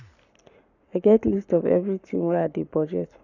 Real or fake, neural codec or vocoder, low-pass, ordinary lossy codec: fake; codec, 44.1 kHz, 7.8 kbps, Pupu-Codec; 7.2 kHz; none